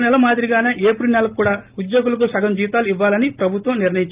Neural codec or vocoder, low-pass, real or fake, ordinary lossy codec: none; 3.6 kHz; real; Opus, 32 kbps